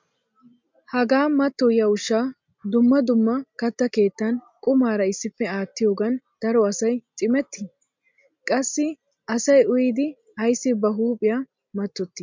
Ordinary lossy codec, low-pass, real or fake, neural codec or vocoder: MP3, 64 kbps; 7.2 kHz; real; none